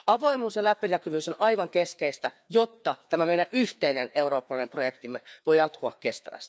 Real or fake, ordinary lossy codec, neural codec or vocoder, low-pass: fake; none; codec, 16 kHz, 2 kbps, FreqCodec, larger model; none